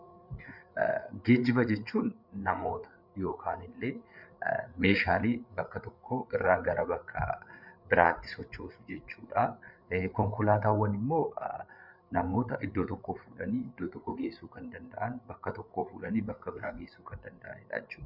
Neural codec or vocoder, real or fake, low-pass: codec, 16 kHz, 8 kbps, FreqCodec, larger model; fake; 5.4 kHz